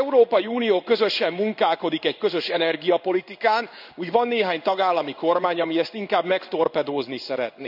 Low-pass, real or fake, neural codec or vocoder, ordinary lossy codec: 5.4 kHz; fake; codec, 16 kHz in and 24 kHz out, 1 kbps, XY-Tokenizer; none